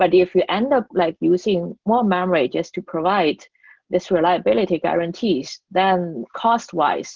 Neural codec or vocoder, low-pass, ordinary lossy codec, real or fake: none; 7.2 kHz; Opus, 16 kbps; real